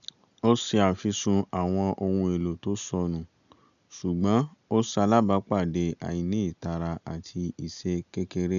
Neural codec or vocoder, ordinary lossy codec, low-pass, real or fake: none; none; 7.2 kHz; real